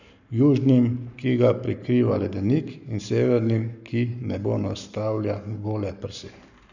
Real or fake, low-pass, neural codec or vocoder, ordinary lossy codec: fake; 7.2 kHz; codec, 44.1 kHz, 7.8 kbps, Pupu-Codec; none